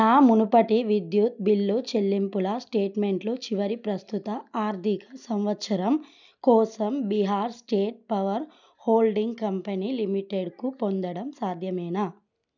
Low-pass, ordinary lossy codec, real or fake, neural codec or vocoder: 7.2 kHz; none; real; none